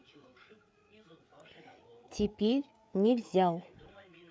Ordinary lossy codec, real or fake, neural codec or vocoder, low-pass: none; fake; codec, 16 kHz, 8 kbps, FreqCodec, larger model; none